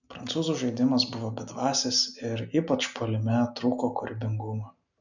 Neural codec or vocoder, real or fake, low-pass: none; real; 7.2 kHz